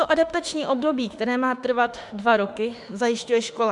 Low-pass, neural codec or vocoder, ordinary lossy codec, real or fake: 10.8 kHz; autoencoder, 48 kHz, 32 numbers a frame, DAC-VAE, trained on Japanese speech; MP3, 96 kbps; fake